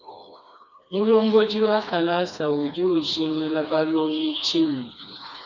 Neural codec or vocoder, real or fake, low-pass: codec, 16 kHz, 2 kbps, FreqCodec, smaller model; fake; 7.2 kHz